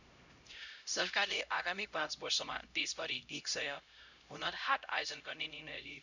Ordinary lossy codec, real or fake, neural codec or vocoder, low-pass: none; fake; codec, 16 kHz, 0.5 kbps, X-Codec, HuBERT features, trained on LibriSpeech; 7.2 kHz